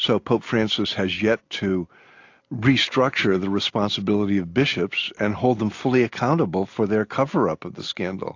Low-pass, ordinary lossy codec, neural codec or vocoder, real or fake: 7.2 kHz; AAC, 48 kbps; none; real